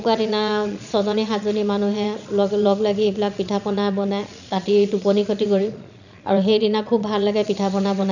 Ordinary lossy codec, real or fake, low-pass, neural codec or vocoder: none; fake; 7.2 kHz; vocoder, 22.05 kHz, 80 mel bands, Vocos